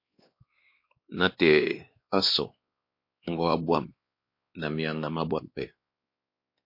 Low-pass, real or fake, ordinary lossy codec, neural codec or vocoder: 5.4 kHz; fake; MP3, 32 kbps; codec, 16 kHz, 2 kbps, X-Codec, WavLM features, trained on Multilingual LibriSpeech